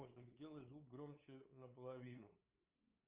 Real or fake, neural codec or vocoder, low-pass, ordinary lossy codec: fake; codec, 16 kHz, 8 kbps, FunCodec, trained on LibriTTS, 25 frames a second; 3.6 kHz; Opus, 32 kbps